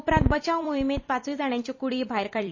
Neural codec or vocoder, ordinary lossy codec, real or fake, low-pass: vocoder, 44.1 kHz, 128 mel bands every 256 samples, BigVGAN v2; MP3, 32 kbps; fake; 7.2 kHz